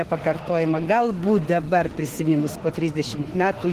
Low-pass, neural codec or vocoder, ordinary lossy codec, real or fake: 14.4 kHz; autoencoder, 48 kHz, 32 numbers a frame, DAC-VAE, trained on Japanese speech; Opus, 16 kbps; fake